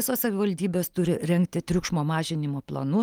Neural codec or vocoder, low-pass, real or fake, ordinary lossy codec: none; 19.8 kHz; real; Opus, 32 kbps